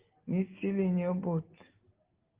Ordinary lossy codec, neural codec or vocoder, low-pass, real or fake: Opus, 16 kbps; none; 3.6 kHz; real